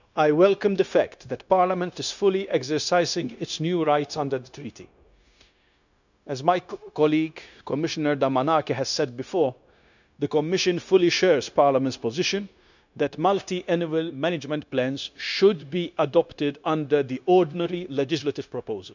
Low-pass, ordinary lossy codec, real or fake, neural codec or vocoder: 7.2 kHz; none; fake; codec, 16 kHz, 0.9 kbps, LongCat-Audio-Codec